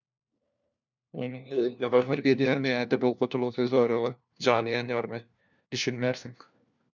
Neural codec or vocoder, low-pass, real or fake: codec, 16 kHz, 1 kbps, FunCodec, trained on LibriTTS, 50 frames a second; 7.2 kHz; fake